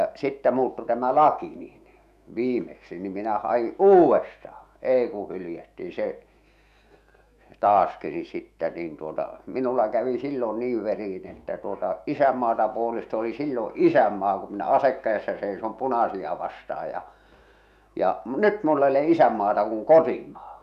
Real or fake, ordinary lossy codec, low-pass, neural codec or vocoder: fake; none; 14.4 kHz; autoencoder, 48 kHz, 128 numbers a frame, DAC-VAE, trained on Japanese speech